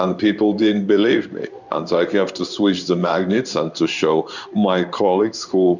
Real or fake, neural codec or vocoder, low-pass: fake; codec, 16 kHz in and 24 kHz out, 1 kbps, XY-Tokenizer; 7.2 kHz